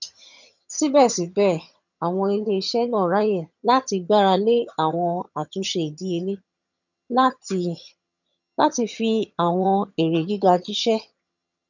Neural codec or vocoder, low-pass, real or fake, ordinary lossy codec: vocoder, 22.05 kHz, 80 mel bands, HiFi-GAN; 7.2 kHz; fake; none